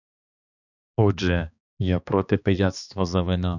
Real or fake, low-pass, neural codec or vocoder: fake; 7.2 kHz; codec, 16 kHz, 2 kbps, X-Codec, HuBERT features, trained on balanced general audio